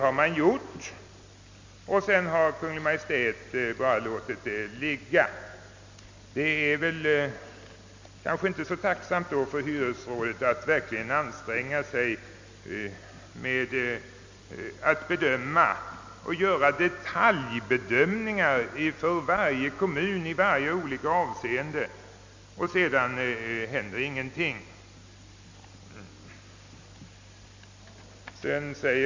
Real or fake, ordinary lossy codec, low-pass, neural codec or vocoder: real; MP3, 64 kbps; 7.2 kHz; none